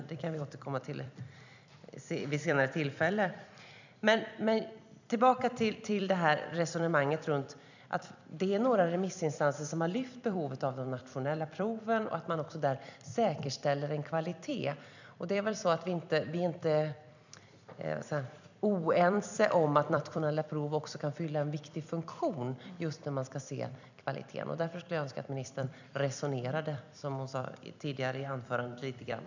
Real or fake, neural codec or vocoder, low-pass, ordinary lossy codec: fake; vocoder, 44.1 kHz, 128 mel bands every 256 samples, BigVGAN v2; 7.2 kHz; none